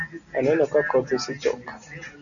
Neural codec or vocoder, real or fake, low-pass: none; real; 7.2 kHz